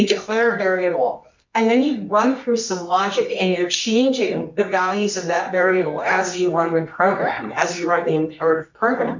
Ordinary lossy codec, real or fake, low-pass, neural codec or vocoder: MP3, 64 kbps; fake; 7.2 kHz; codec, 24 kHz, 0.9 kbps, WavTokenizer, medium music audio release